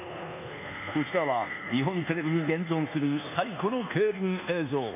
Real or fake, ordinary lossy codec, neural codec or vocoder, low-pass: fake; none; codec, 24 kHz, 1.2 kbps, DualCodec; 3.6 kHz